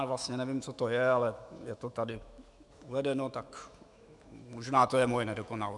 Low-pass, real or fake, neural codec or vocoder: 10.8 kHz; fake; autoencoder, 48 kHz, 128 numbers a frame, DAC-VAE, trained on Japanese speech